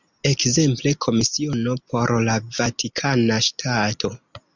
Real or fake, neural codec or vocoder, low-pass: real; none; 7.2 kHz